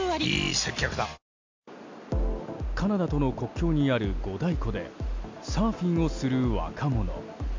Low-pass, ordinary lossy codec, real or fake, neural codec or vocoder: 7.2 kHz; MP3, 64 kbps; real; none